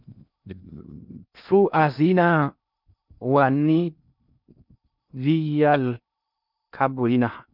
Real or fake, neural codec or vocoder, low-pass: fake; codec, 16 kHz in and 24 kHz out, 0.6 kbps, FocalCodec, streaming, 2048 codes; 5.4 kHz